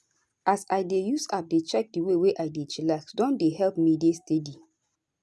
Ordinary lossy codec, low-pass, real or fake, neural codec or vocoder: none; none; real; none